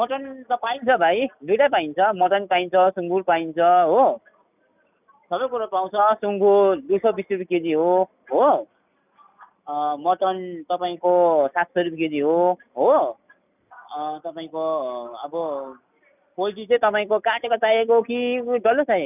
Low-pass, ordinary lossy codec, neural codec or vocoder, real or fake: 3.6 kHz; none; none; real